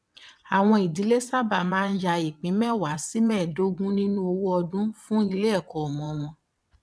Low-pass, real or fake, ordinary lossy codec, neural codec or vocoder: none; fake; none; vocoder, 22.05 kHz, 80 mel bands, WaveNeXt